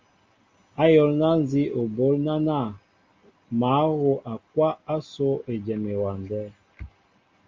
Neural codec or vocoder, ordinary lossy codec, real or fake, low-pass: none; Opus, 32 kbps; real; 7.2 kHz